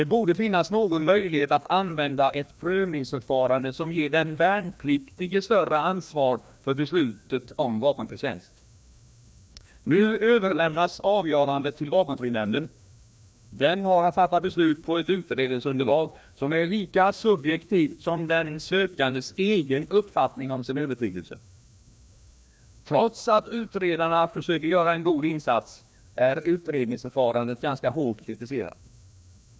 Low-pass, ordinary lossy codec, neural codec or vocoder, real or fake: none; none; codec, 16 kHz, 1 kbps, FreqCodec, larger model; fake